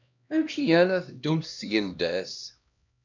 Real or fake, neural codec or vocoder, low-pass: fake; codec, 16 kHz, 1 kbps, X-Codec, HuBERT features, trained on LibriSpeech; 7.2 kHz